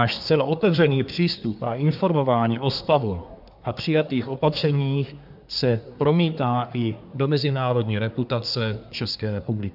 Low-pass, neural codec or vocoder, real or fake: 5.4 kHz; codec, 24 kHz, 1 kbps, SNAC; fake